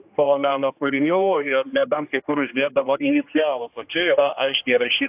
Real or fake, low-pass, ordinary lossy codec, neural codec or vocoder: fake; 3.6 kHz; AAC, 32 kbps; codec, 16 kHz, 1 kbps, X-Codec, HuBERT features, trained on general audio